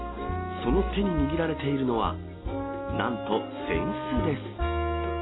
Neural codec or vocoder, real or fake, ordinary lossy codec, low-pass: none; real; AAC, 16 kbps; 7.2 kHz